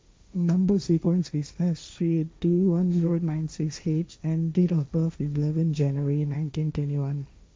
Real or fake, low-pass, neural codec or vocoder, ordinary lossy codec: fake; none; codec, 16 kHz, 1.1 kbps, Voila-Tokenizer; none